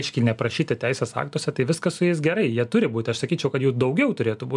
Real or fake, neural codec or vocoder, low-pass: real; none; 10.8 kHz